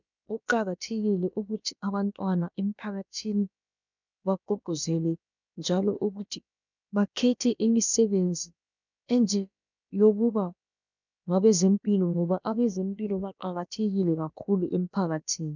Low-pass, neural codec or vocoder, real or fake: 7.2 kHz; codec, 16 kHz, about 1 kbps, DyCAST, with the encoder's durations; fake